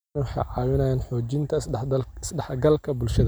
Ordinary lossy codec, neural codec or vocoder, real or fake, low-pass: none; none; real; none